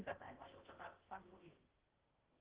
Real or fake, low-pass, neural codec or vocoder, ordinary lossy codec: fake; 3.6 kHz; codec, 16 kHz in and 24 kHz out, 0.6 kbps, FocalCodec, streaming, 4096 codes; Opus, 16 kbps